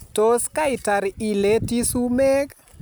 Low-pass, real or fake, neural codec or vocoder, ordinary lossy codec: none; real; none; none